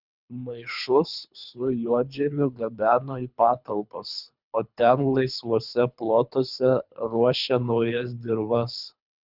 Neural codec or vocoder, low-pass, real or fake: codec, 24 kHz, 3 kbps, HILCodec; 5.4 kHz; fake